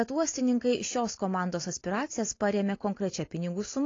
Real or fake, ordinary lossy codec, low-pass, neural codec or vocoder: real; AAC, 32 kbps; 7.2 kHz; none